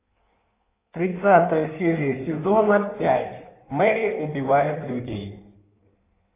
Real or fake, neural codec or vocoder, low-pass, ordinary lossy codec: fake; codec, 16 kHz in and 24 kHz out, 1.1 kbps, FireRedTTS-2 codec; 3.6 kHz; AAC, 24 kbps